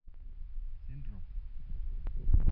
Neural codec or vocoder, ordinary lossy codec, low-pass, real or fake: none; none; 5.4 kHz; real